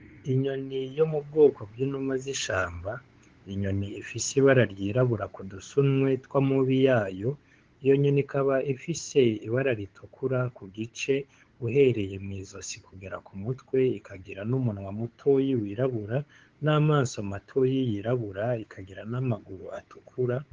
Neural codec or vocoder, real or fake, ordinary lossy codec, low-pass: codec, 16 kHz, 8 kbps, FunCodec, trained on LibriTTS, 25 frames a second; fake; Opus, 16 kbps; 7.2 kHz